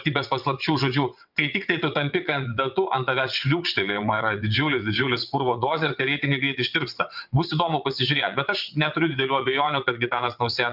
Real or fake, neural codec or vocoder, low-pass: fake; vocoder, 22.05 kHz, 80 mel bands, WaveNeXt; 5.4 kHz